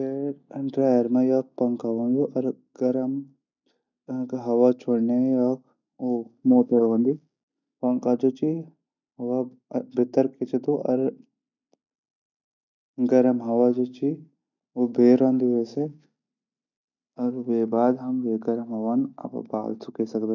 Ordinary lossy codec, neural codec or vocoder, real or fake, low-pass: none; none; real; 7.2 kHz